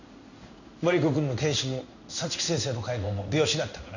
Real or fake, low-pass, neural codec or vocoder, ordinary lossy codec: fake; 7.2 kHz; codec, 16 kHz in and 24 kHz out, 1 kbps, XY-Tokenizer; none